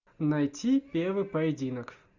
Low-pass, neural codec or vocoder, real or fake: 7.2 kHz; none; real